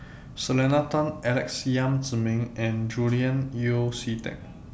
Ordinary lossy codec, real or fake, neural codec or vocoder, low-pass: none; real; none; none